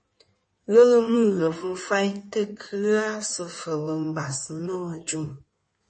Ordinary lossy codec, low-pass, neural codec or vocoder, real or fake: MP3, 32 kbps; 9.9 kHz; codec, 16 kHz in and 24 kHz out, 1.1 kbps, FireRedTTS-2 codec; fake